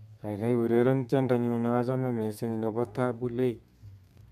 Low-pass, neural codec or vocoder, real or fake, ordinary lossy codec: 14.4 kHz; codec, 32 kHz, 1.9 kbps, SNAC; fake; none